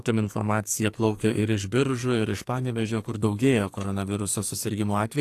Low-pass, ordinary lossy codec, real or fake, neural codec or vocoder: 14.4 kHz; AAC, 64 kbps; fake; codec, 44.1 kHz, 2.6 kbps, SNAC